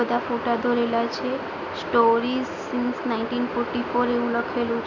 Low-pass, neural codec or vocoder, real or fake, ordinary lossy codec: 7.2 kHz; none; real; none